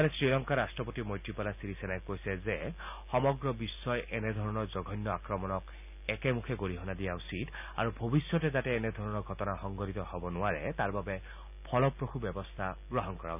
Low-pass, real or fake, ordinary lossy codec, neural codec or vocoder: 3.6 kHz; real; none; none